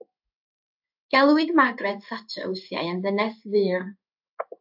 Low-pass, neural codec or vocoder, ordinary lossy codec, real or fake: 5.4 kHz; codec, 16 kHz in and 24 kHz out, 1 kbps, XY-Tokenizer; AAC, 48 kbps; fake